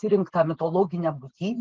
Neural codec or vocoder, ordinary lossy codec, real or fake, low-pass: none; Opus, 16 kbps; real; 7.2 kHz